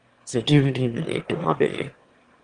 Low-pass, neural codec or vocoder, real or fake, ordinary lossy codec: 9.9 kHz; autoencoder, 22.05 kHz, a latent of 192 numbers a frame, VITS, trained on one speaker; fake; Opus, 24 kbps